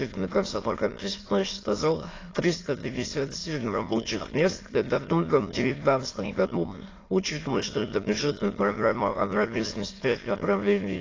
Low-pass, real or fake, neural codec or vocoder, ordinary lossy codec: 7.2 kHz; fake; autoencoder, 22.05 kHz, a latent of 192 numbers a frame, VITS, trained on many speakers; AAC, 32 kbps